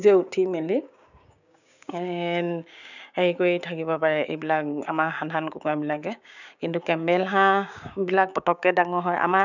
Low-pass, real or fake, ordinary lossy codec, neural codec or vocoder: 7.2 kHz; fake; none; codec, 16 kHz, 6 kbps, DAC